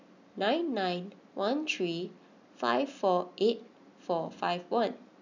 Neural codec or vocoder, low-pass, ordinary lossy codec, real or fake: none; 7.2 kHz; none; real